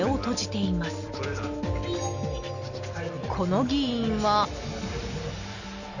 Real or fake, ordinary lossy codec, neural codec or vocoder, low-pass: real; none; none; 7.2 kHz